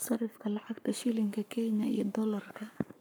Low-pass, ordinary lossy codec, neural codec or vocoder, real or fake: none; none; codec, 44.1 kHz, 7.8 kbps, Pupu-Codec; fake